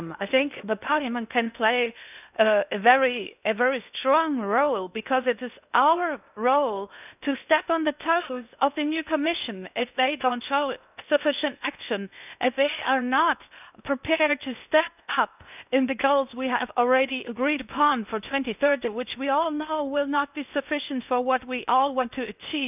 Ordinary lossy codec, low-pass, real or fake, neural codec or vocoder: none; 3.6 kHz; fake; codec, 16 kHz in and 24 kHz out, 0.6 kbps, FocalCodec, streaming, 2048 codes